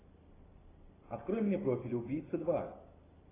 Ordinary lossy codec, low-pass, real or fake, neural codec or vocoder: AAC, 16 kbps; 3.6 kHz; real; none